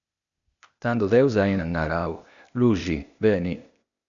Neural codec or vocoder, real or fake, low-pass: codec, 16 kHz, 0.8 kbps, ZipCodec; fake; 7.2 kHz